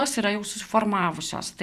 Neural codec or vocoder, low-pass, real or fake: vocoder, 44.1 kHz, 128 mel bands every 512 samples, BigVGAN v2; 14.4 kHz; fake